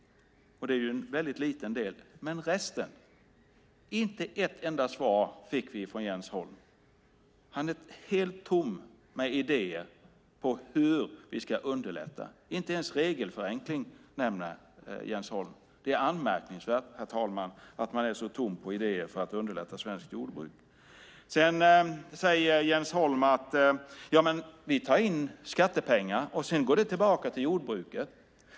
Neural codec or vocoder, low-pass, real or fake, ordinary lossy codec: none; none; real; none